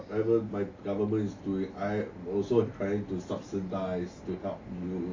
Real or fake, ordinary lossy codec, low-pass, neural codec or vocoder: real; AAC, 32 kbps; 7.2 kHz; none